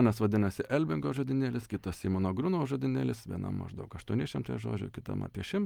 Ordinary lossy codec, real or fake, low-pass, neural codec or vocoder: Opus, 32 kbps; real; 19.8 kHz; none